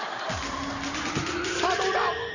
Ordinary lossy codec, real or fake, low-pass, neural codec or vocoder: none; real; 7.2 kHz; none